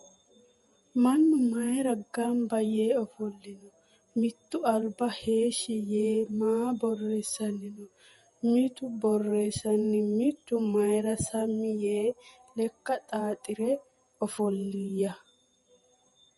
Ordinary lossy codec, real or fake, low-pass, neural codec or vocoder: MP3, 48 kbps; fake; 19.8 kHz; vocoder, 48 kHz, 128 mel bands, Vocos